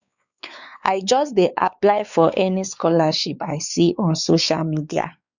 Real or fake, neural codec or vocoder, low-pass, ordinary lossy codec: fake; codec, 16 kHz, 4 kbps, X-Codec, WavLM features, trained on Multilingual LibriSpeech; 7.2 kHz; none